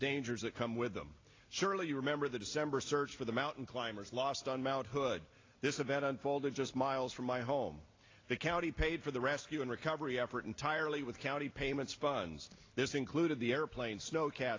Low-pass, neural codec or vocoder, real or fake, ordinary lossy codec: 7.2 kHz; none; real; AAC, 32 kbps